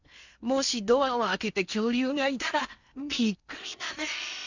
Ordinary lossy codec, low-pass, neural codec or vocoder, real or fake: Opus, 64 kbps; 7.2 kHz; codec, 16 kHz in and 24 kHz out, 0.8 kbps, FocalCodec, streaming, 65536 codes; fake